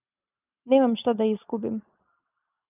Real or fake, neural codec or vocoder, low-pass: real; none; 3.6 kHz